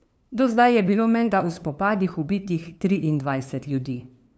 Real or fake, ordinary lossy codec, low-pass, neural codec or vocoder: fake; none; none; codec, 16 kHz, 2 kbps, FunCodec, trained on LibriTTS, 25 frames a second